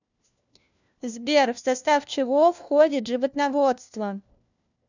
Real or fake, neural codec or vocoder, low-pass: fake; codec, 16 kHz, 1 kbps, FunCodec, trained on LibriTTS, 50 frames a second; 7.2 kHz